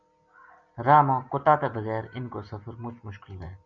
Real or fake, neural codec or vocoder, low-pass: real; none; 7.2 kHz